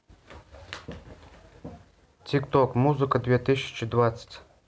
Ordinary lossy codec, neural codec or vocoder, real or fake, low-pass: none; none; real; none